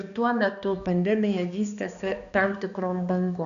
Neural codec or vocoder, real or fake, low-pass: codec, 16 kHz, 2 kbps, X-Codec, HuBERT features, trained on balanced general audio; fake; 7.2 kHz